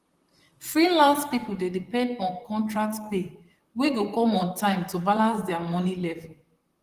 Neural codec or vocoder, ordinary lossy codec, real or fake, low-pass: vocoder, 44.1 kHz, 128 mel bands, Pupu-Vocoder; Opus, 24 kbps; fake; 14.4 kHz